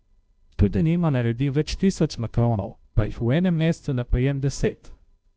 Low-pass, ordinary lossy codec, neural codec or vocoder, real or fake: none; none; codec, 16 kHz, 0.5 kbps, FunCodec, trained on Chinese and English, 25 frames a second; fake